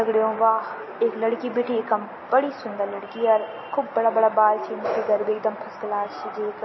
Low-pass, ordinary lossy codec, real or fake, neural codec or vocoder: 7.2 kHz; MP3, 24 kbps; real; none